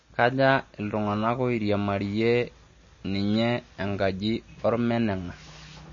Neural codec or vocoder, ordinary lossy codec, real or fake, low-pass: none; MP3, 32 kbps; real; 7.2 kHz